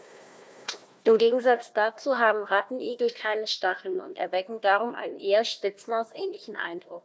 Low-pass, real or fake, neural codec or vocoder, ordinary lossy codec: none; fake; codec, 16 kHz, 1 kbps, FunCodec, trained on Chinese and English, 50 frames a second; none